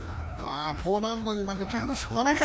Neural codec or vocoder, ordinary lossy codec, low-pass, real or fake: codec, 16 kHz, 1 kbps, FreqCodec, larger model; none; none; fake